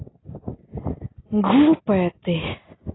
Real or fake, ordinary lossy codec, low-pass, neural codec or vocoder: real; AAC, 16 kbps; 7.2 kHz; none